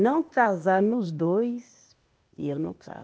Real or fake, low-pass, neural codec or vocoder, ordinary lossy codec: fake; none; codec, 16 kHz, 0.8 kbps, ZipCodec; none